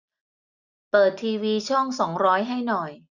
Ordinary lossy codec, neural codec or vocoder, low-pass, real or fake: none; none; 7.2 kHz; real